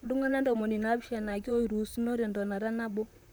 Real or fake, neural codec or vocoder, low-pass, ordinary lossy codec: fake; vocoder, 44.1 kHz, 128 mel bands, Pupu-Vocoder; none; none